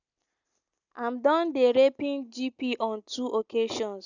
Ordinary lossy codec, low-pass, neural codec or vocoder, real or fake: none; 7.2 kHz; none; real